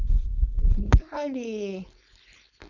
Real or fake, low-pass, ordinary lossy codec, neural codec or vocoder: fake; 7.2 kHz; none; codec, 16 kHz, 4.8 kbps, FACodec